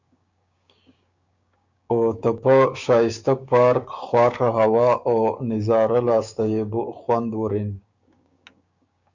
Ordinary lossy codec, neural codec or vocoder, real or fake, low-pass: Opus, 64 kbps; codec, 16 kHz, 6 kbps, DAC; fake; 7.2 kHz